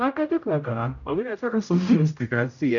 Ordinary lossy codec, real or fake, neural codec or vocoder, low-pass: none; fake; codec, 16 kHz, 0.5 kbps, X-Codec, HuBERT features, trained on general audio; 7.2 kHz